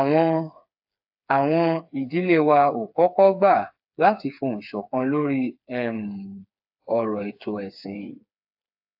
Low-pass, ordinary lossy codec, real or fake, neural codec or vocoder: 5.4 kHz; AAC, 48 kbps; fake; codec, 16 kHz, 4 kbps, FreqCodec, smaller model